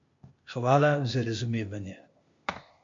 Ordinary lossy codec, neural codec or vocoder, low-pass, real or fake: MP3, 48 kbps; codec, 16 kHz, 0.8 kbps, ZipCodec; 7.2 kHz; fake